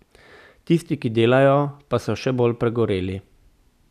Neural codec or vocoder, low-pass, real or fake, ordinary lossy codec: none; 14.4 kHz; real; none